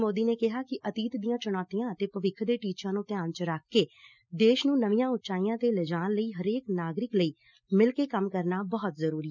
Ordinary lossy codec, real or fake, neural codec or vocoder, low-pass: none; real; none; 7.2 kHz